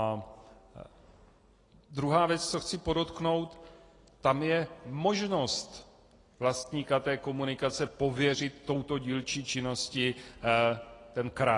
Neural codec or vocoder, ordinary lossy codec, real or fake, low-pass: none; AAC, 32 kbps; real; 10.8 kHz